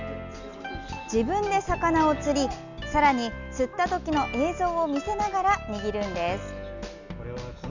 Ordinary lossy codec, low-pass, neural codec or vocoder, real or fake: none; 7.2 kHz; none; real